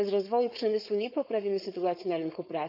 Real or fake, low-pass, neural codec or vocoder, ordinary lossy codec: fake; 5.4 kHz; codec, 16 kHz, 4.8 kbps, FACodec; none